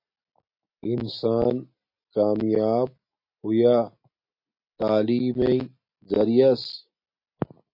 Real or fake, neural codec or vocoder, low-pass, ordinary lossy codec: real; none; 5.4 kHz; MP3, 32 kbps